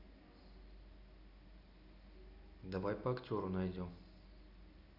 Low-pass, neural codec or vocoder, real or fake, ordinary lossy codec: 5.4 kHz; none; real; none